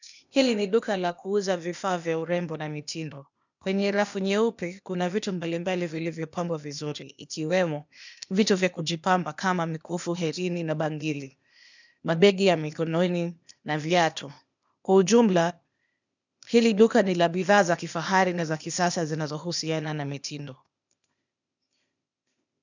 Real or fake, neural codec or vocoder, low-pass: fake; codec, 16 kHz, 0.8 kbps, ZipCodec; 7.2 kHz